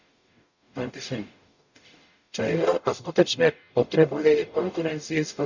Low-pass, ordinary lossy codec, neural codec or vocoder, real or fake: 7.2 kHz; none; codec, 44.1 kHz, 0.9 kbps, DAC; fake